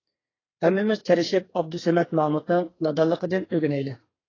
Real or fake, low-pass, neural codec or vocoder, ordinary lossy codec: fake; 7.2 kHz; codec, 32 kHz, 1.9 kbps, SNAC; AAC, 32 kbps